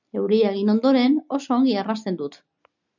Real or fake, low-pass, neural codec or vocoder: real; 7.2 kHz; none